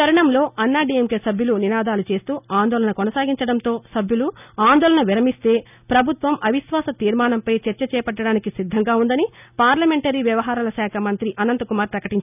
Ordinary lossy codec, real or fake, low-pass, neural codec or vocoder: none; real; 3.6 kHz; none